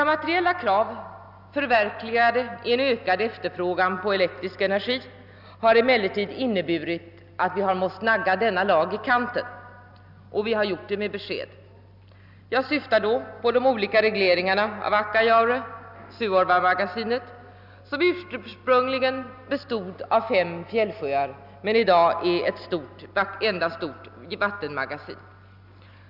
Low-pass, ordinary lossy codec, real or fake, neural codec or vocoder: 5.4 kHz; none; real; none